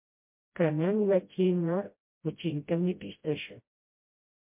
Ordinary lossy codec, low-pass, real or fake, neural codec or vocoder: MP3, 32 kbps; 3.6 kHz; fake; codec, 16 kHz, 0.5 kbps, FreqCodec, smaller model